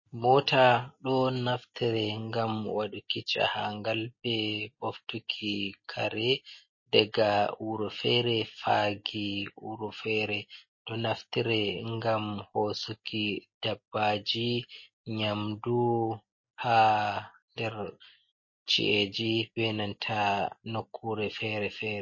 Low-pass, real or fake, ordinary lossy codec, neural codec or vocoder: 7.2 kHz; real; MP3, 32 kbps; none